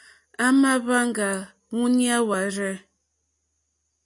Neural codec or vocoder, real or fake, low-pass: none; real; 10.8 kHz